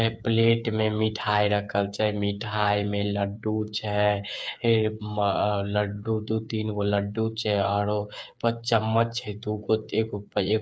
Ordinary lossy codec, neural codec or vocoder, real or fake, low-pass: none; codec, 16 kHz, 8 kbps, FreqCodec, smaller model; fake; none